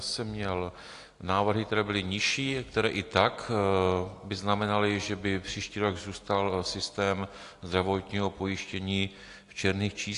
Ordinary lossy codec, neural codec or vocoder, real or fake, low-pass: AAC, 48 kbps; none; real; 10.8 kHz